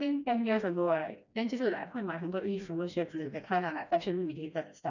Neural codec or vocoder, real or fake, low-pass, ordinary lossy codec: codec, 16 kHz, 1 kbps, FreqCodec, smaller model; fake; 7.2 kHz; none